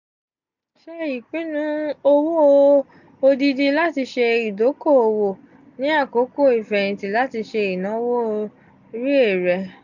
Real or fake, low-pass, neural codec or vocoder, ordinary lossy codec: real; 7.2 kHz; none; none